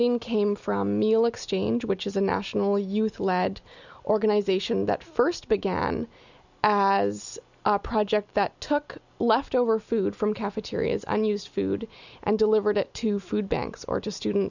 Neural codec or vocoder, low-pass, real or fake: none; 7.2 kHz; real